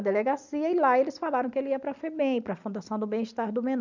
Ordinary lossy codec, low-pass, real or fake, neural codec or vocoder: none; 7.2 kHz; real; none